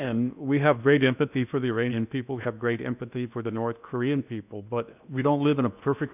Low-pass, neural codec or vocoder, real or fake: 3.6 kHz; codec, 16 kHz in and 24 kHz out, 0.8 kbps, FocalCodec, streaming, 65536 codes; fake